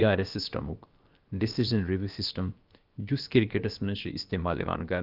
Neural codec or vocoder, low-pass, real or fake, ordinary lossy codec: codec, 16 kHz, about 1 kbps, DyCAST, with the encoder's durations; 5.4 kHz; fake; Opus, 32 kbps